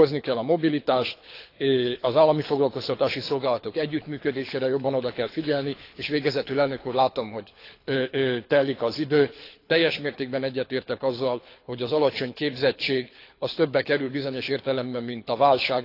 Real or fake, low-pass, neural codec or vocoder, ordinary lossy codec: fake; 5.4 kHz; codec, 24 kHz, 6 kbps, HILCodec; AAC, 32 kbps